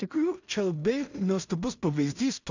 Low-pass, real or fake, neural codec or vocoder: 7.2 kHz; fake; codec, 16 kHz in and 24 kHz out, 0.4 kbps, LongCat-Audio-Codec, two codebook decoder